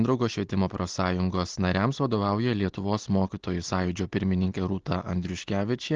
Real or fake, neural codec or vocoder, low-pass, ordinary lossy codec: real; none; 7.2 kHz; Opus, 16 kbps